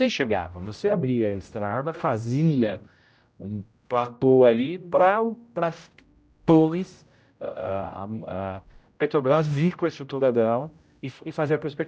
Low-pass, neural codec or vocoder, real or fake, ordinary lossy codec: none; codec, 16 kHz, 0.5 kbps, X-Codec, HuBERT features, trained on general audio; fake; none